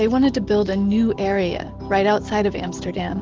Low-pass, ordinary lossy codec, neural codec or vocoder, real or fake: 7.2 kHz; Opus, 16 kbps; none; real